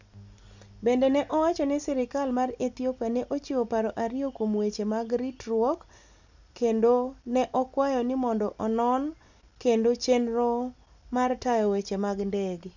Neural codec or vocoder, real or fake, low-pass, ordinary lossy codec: none; real; 7.2 kHz; none